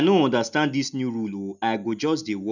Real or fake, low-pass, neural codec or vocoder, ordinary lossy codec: real; 7.2 kHz; none; none